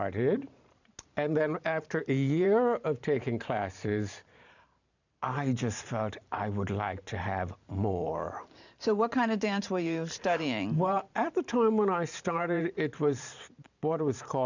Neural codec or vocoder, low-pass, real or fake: vocoder, 44.1 kHz, 128 mel bands every 512 samples, BigVGAN v2; 7.2 kHz; fake